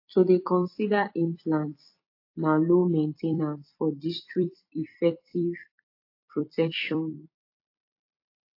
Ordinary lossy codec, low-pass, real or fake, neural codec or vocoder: AAC, 32 kbps; 5.4 kHz; fake; vocoder, 44.1 kHz, 128 mel bands every 256 samples, BigVGAN v2